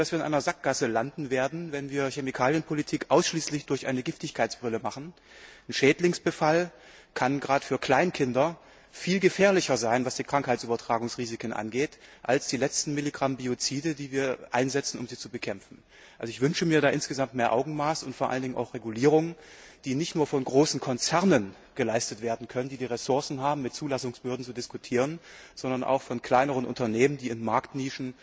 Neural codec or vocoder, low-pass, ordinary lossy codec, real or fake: none; none; none; real